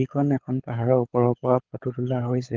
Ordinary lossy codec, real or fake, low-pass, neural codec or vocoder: Opus, 32 kbps; fake; 7.2 kHz; codec, 24 kHz, 6 kbps, HILCodec